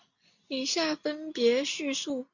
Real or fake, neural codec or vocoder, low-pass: real; none; 7.2 kHz